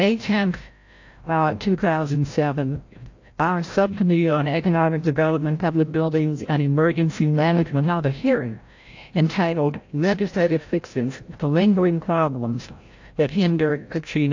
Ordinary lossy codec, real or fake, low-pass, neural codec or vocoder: AAC, 48 kbps; fake; 7.2 kHz; codec, 16 kHz, 0.5 kbps, FreqCodec, larger model